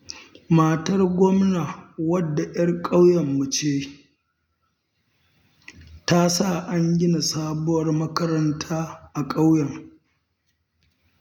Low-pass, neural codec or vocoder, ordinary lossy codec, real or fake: 19.8 kHz; none; none; real